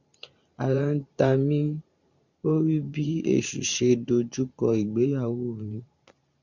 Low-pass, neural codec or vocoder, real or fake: 7.2 kHz; vocoder, 44.1 kHz, 128 mel bands every 512 samples, BigVGAN v2; fake